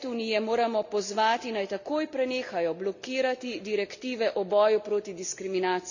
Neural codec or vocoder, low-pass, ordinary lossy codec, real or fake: none; 7.2 kHz; none; real